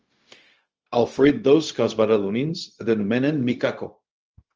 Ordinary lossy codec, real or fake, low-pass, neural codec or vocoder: Opus, 24 kbps; fake; 7.2 kHz; codec, 16 kHz, 0.4 kbps, LongCat-Audio-Codec